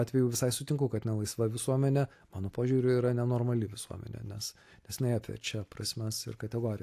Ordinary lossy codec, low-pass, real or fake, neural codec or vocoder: AAC, 64 kbps; 14.4 kHz; real; none